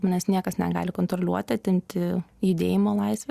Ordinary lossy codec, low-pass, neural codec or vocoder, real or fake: Opus, 64 kbps; 14.4 kHz; none; real